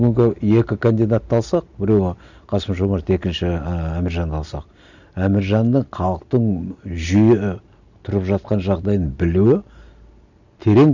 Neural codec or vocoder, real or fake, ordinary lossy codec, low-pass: none; real; none; 7.2 kHz